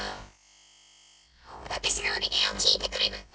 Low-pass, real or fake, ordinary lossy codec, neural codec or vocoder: none; fake; none; codec, 16 kHz, about 1 kbps, DyCAST, with the encoder's durations